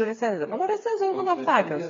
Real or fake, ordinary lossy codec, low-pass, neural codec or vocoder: fake; AAC, 32 kbps; 7.2 kHz; codec, 16 kHz, 4 kbps, FreqCodec, smaller model